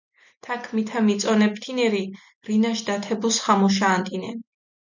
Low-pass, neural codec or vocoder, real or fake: 7.2 kHz; none; real